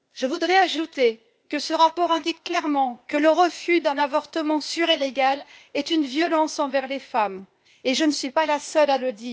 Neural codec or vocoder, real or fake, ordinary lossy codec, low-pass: codec, 16 kHz, 0.8 kbps, ZipCodec; fake; none; none